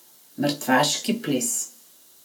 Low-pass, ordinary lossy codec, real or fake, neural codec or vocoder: none; none; real; none